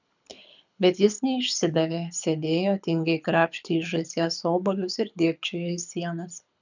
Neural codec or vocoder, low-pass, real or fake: codec, 24 kHz, 6 kbps, HILCodec; 7.2 kHz; fake